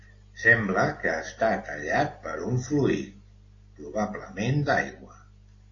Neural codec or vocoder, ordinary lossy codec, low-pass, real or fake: none; AAC, 32 kbps; 7.2 kHz; real